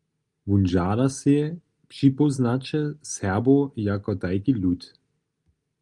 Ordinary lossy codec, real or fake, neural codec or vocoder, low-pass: Opus, 32 kbps; real; none; 10.8 kHz